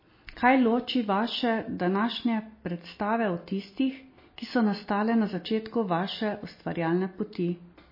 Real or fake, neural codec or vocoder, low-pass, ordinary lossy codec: real; none; 5.4 kHz; MP3, 24 kbps